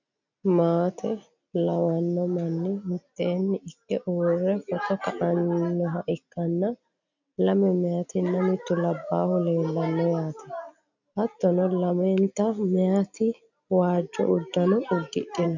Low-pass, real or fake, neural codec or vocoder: 7.2 kHz; real; none